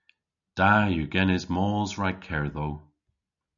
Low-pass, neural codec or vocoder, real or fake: 7.2 kHz; none; real